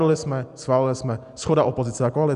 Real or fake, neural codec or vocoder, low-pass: real; none; 10.8 kHz